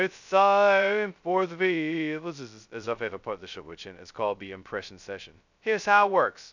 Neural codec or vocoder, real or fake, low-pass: codec, 16 kHz, 0.2 kbps, FocalCodec; fake; 7.2 kHz